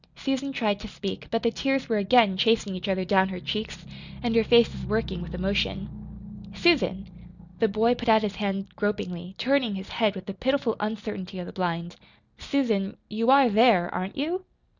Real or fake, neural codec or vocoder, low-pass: real; none; 7.2 kHz